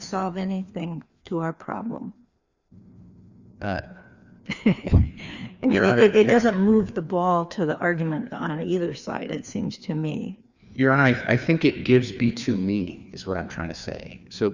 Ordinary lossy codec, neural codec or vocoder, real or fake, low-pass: Opus, 64 kbps; codec, 16 kHz, 2 kbps, FreqCodec, larger model; fake; 7.2 kHz